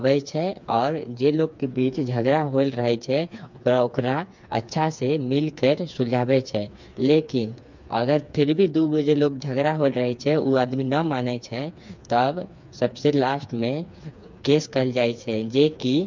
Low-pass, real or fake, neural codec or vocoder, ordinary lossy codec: 7.2 kHz; fake; codec, 16 kHz, 4 kbps, FreqCodec, smaller model; MP3, 64 kbps